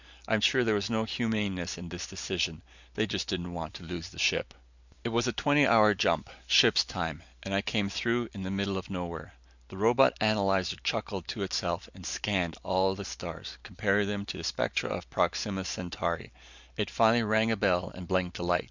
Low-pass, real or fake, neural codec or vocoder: 7.2 kHz; real; none